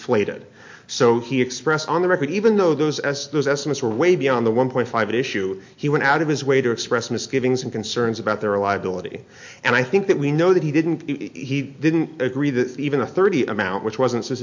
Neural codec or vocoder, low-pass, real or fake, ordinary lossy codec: none; 7.2 kHz; real; MP3, 48 kbps